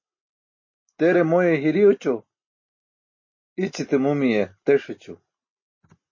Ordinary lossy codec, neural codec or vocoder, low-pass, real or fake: MP3, 32 kbps; none; 7.2 kHz; real